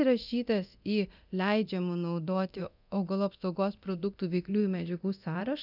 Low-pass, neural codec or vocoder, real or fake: 5.4 kHz; codec, 24 kHz, 0.9 kbps, DualCodec; fake